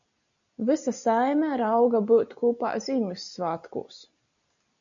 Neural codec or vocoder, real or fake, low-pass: none; real; 7.2 kHz